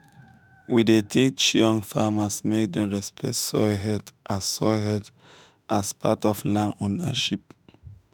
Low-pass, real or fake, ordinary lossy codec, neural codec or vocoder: none; fake; none; autoencoder, 48 kHz, 32 numbers a frame, DAC-VAE, trained on Japanese speech